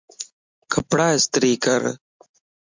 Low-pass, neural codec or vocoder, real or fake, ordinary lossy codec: 7.2 kHz; none; real; MP3, 64 kbps